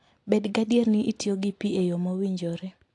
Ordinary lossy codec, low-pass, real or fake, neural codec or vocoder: AAC, 48 kbps; 10.8 kHz; real; none